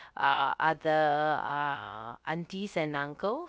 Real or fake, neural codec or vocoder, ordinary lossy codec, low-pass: fake; codec, 16 kHz, 0.3 kbps, FocalCodec; none; none